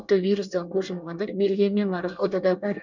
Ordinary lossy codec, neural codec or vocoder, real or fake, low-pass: none; codec, 24 kHz, 1 kbps, SNAC; fake; 7.2 kHz